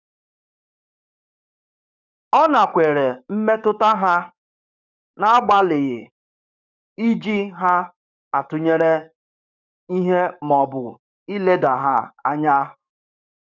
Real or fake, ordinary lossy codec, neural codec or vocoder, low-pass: fake; none; codec, 44.1 kHz, 7.8 kbps, DAC; 7.2 kHz